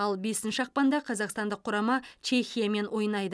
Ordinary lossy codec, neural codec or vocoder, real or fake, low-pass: none; none; real; none